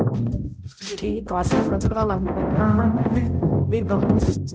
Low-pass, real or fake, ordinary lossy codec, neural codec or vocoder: none; fake; none; codec, 16 kHz, 0.5 kbps, X-Codec, HuBERT features, trained on balanced general audio